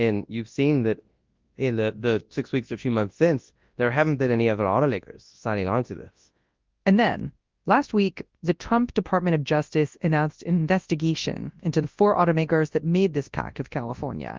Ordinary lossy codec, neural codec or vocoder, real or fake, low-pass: Opus, 32 kbps; codec, 24 kHz, 0.9 kbps, WavTokenizer, large speech release; fake; 7.2 kHz